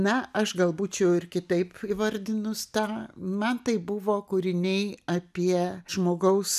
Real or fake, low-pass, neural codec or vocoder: real; 14.4 kHz; none